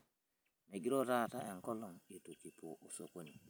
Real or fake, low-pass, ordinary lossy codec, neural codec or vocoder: real; none; none; none